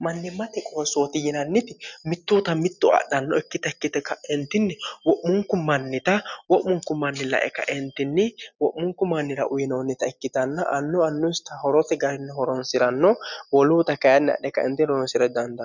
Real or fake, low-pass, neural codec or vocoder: real; 7.2 kHz; none